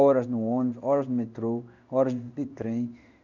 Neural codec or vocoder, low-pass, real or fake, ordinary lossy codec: codec, 16 kHz in and 24 kHz out, 1 kbps, XY-Tokenizer; 7.2 kHz; fake; none